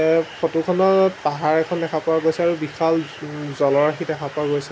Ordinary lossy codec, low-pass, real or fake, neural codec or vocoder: none; none; real; none